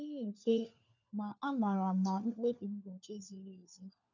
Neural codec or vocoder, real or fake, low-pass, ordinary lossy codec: codec, 16 kHz, 4 kbps, FunCodec, trained on LibriTTS, 50 frames a second; fake; 7.2 kHz; none